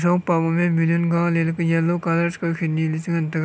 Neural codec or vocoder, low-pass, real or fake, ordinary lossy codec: none; none; real; none